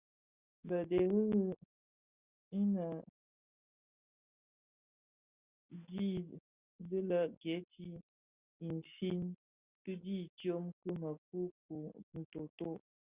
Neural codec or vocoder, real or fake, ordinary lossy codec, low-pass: none; real; Opus, 64 kbps; 3.6 kHz